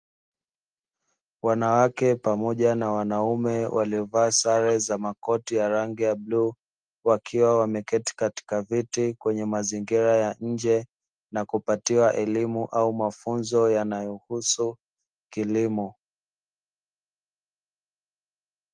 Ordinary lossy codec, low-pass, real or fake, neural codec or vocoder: Opus, 24 kbps; 9.9 kHz; real; none